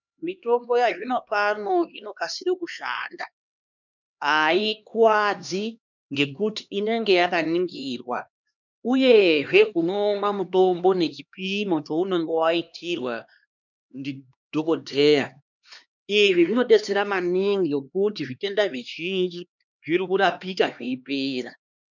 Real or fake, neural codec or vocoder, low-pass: fake; codec, 16 kHz, 2 kbps, X-Codec, HuBERT features, trained on LibriSpeech; 7.2 kHz